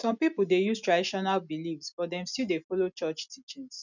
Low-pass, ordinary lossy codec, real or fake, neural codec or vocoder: 7.2 kHz; none; real; none